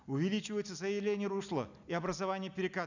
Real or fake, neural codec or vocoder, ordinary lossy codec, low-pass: real; none; none; 7.2 kHz